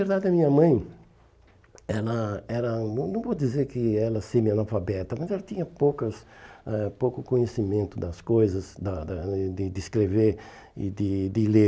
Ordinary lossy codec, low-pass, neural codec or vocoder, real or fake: none; none; none; real